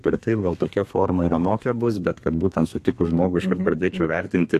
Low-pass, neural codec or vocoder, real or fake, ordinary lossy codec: 14.4 kHz; codec, 32 kHz, 1.9 kbps, SNAC; fake; AAC, 64 kbps